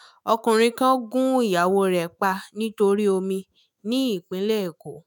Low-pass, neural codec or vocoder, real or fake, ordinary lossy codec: none; autoencoder, 48 kHz, 128 numbers a frame, DAC-VAE, trained on Japanese speech; fake; none